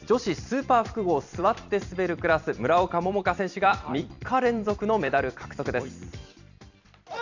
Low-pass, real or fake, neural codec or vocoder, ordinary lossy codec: 7.2 kHz; fake; vocoder, 44.1 kHz, 128 mel bands every 256 samples, BigVGAN v2; none